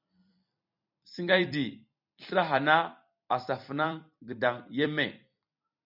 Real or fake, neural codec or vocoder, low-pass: fake; vocoder, 44.1 kHz, 128 mel bands every 256 samples, BigVGAN v2; 5.4 kHz